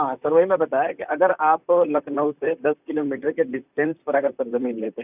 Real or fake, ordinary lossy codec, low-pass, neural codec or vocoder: fake; none; 3.6 kHz; vocoder, 44.1 kHz, 128 mel bands, Pupu-Vocoder